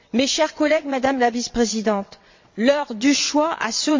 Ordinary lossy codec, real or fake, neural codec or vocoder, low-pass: MP3, 64 kbps; fake; vocoder, 22.05 kHz, 80 mel bands, WaveNeXt; 7.2 kHz